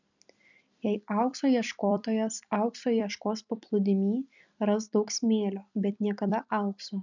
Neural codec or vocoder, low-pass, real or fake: vocoder, 44.1 kHz, 128 mel bands every 256 samples, BigVGAN v2; 7.2 kHz; fake